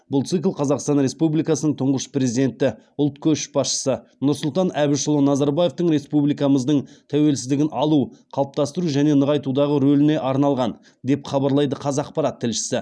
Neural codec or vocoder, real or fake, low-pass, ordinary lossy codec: none; real; none; none